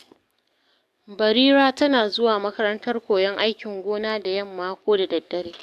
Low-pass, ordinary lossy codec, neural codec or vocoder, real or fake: 14.4 kHz; AAC, 96 kbps; codec, 44.1 kHz, 7.8 kbps, DAC; fake